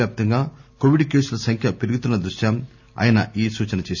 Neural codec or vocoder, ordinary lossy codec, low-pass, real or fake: none; none; 7.2 kHz; real